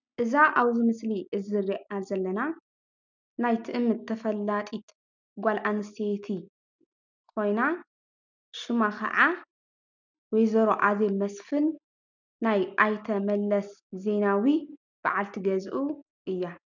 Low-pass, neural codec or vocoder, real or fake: 7.2 kHz; none; real